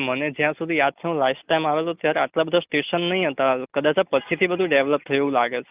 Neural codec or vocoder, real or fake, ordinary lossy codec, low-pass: none; real; Opus, 24 kbps; 3.6 kHz